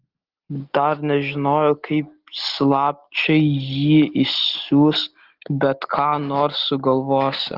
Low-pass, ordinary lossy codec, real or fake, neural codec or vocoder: 5.4 kHz; Opus, 16 kbps; real; none